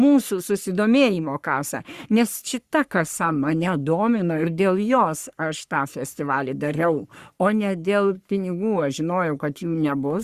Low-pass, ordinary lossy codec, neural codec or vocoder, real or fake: 14.4 kHz; Opus, 64 kbps; codec, 44.1 kHz, 3.4 kbps, Pupu-Codec; fake